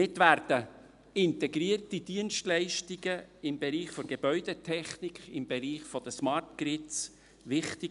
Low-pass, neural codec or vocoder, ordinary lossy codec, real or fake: 10.8 kHz; none; none; real